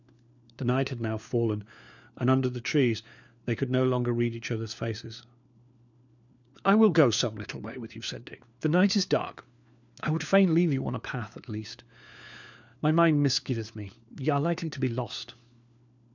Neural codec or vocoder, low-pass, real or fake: codec, 16 kHz, 4 kbps, FunCodec, trained on LibriTTS, 50 frames a second; 7.2 kHz; fake